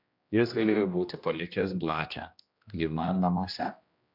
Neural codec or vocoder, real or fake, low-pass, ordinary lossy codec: codec, 16 kHz, 1 kbps, X-Codec, HuBERT features, trained on general audio; fake; 5.4 kHz; MP3, 48 kbps